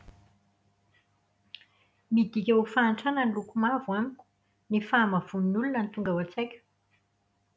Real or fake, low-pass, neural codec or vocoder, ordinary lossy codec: real; none; none; none